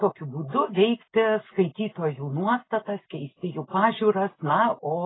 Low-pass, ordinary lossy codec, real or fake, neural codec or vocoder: 7.2 kHz; AAC, 16 kbps; real; none